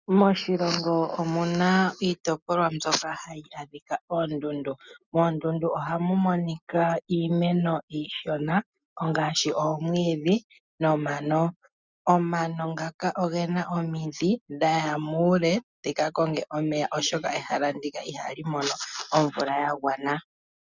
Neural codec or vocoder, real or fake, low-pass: none; real; 7.2 kHz